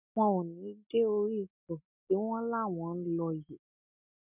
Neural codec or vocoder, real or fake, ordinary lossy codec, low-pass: none; real; none; 3.6 kHz